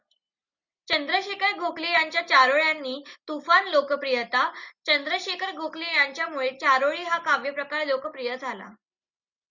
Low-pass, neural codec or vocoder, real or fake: 7.2 kHz; none; real